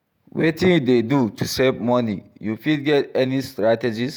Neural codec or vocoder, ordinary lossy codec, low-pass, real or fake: vocoder, 48 kHz, 128 mel bands, Vocos; none; none; fake